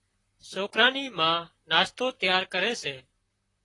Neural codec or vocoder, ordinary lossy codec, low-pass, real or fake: vocoder, 44.1 kHz, 128 mel bands, Pupu-Vocoder; AAC, 32 kbps; 10.8 kHz; fake